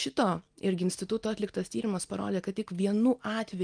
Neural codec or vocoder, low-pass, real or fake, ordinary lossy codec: none; 9.9 kHz; real; Opus, 32 kbps